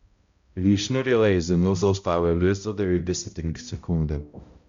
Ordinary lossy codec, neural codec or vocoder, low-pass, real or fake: Opus, 64 kbps; codec, 16 kHz, 0.5 kbps, X-Codec, HuBERT features, trained on balanced general audio; 7.2 kHz; fake